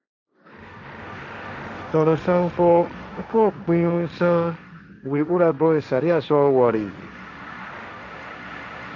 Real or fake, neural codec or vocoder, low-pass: fake; codec, 16 kHz, 1.1 kbps, Voila-Tokenizer; 7.2 kHz